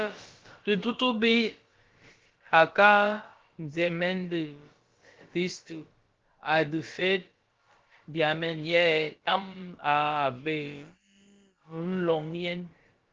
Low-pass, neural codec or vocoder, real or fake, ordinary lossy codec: 7.2 kHz; codec, 16 kHz, about 1 kbps, DyCAST, with the encoder's durations; fake; Opus, 16 kbps